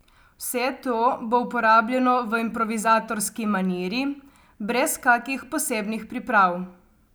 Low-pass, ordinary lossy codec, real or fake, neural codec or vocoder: none; none; real; none